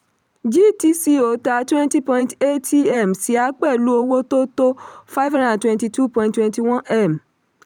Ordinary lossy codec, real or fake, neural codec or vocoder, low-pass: none; fake; vocoder, 44.1 kHz, 128 mel bands every 512 samples, BigVGAN v2; 19.8 kHz